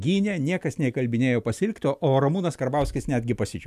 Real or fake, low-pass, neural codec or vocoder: real; 14.4 kHz; none